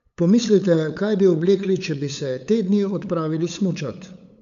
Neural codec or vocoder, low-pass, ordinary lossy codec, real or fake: codec, 16 kHz, 8 kbps, FunCodec, trained on LibriTTS, 25 frames a second; 7.2 kHz; none; fake